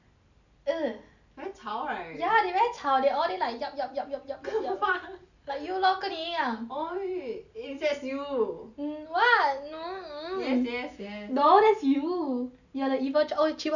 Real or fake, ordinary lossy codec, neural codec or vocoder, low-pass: real; none; none; 7.2 kHz